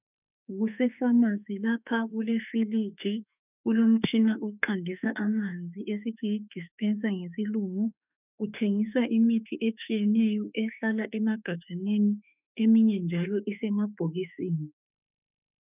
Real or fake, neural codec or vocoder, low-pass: fake; autoencoder, 48 kHz, 32 numbers a frame, DAC-VAE, trained on Japanese speech; 3.6 kHz